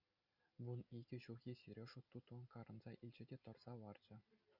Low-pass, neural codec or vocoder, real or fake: 5.4 kHz; none; real